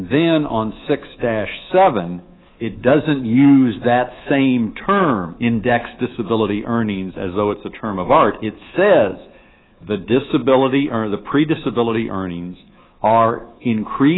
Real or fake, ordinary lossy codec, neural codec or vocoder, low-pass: fake; AAC, 16 kbps; codec, 24 kHz, 3.1 kbps, DualCodec; 7.2 kHz